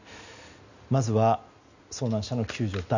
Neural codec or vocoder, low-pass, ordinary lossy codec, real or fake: none; 7.2 kHz; none; real